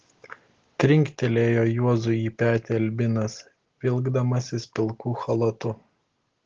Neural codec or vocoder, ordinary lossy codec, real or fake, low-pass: none; Opus, 16 kbps; real; 7.2 kHz